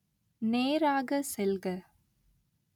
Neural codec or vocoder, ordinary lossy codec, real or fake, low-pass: none; none; real; 19.8 kHz